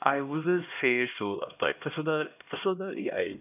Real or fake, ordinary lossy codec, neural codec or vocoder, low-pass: fake; none; codec, 16 kHz, 1 kbps, X-Codec, HuBERT features, trained on LibriSpeech; 3.6 kHz